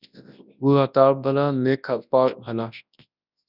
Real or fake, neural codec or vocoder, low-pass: fake; codec, 24 kHz, 0.9 kbps, WavTokenizer, large speech release; 5.4 kHz